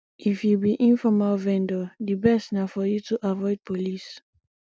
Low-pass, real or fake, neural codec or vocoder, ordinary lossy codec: none; real; none; none